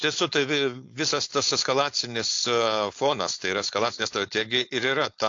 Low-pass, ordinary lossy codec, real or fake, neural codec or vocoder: 7.2 kHz; AAC, 48 kbps; fake; codec, 16 kHz, 4.8 kbps, FACodec